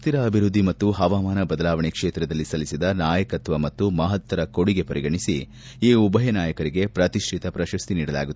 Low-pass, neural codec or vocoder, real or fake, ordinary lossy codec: none; none; real; none